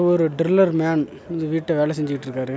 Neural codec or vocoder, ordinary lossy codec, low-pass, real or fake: none; none; none; real